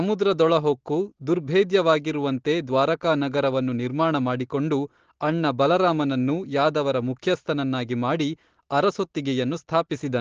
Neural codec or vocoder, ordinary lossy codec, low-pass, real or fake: none; Opus, 16 kbps; 7.2 kHz; real